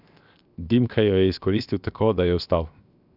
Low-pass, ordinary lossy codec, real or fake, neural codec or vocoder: 5.4 kHz; none; fake; codec, 16 kHz, 0.7 kbps, FocalCodec